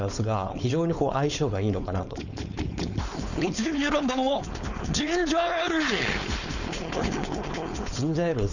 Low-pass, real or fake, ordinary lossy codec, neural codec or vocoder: 7.2 kHz; fake; none; codec, 16 kHz, 4.8 kbps, FACodec